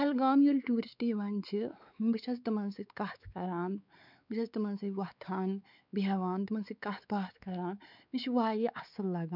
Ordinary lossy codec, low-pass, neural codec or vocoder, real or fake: none; 5.4 kHz; codec, 16 kHz, 4 kbps, X-Codec, WavLM features, trained on Multilingual LibriSpeech; fake